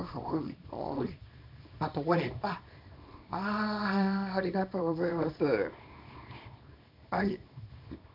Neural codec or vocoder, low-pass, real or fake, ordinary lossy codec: codec, 24 kHz, 0.9 kbps, WavTokenizer, small release; 5.4 kHz; fake; MP3, 48 kbps